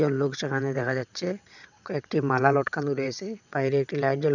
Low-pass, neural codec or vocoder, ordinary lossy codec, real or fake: 7.2 kHz; vocoder, 22.05 kHz, 80 mel bands, WaveNeXt; none; fake